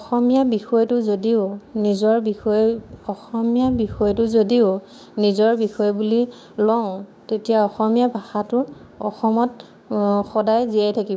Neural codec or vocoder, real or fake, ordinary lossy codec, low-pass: codec, 16 kHz, 6 kbps, DAC; fake; none; none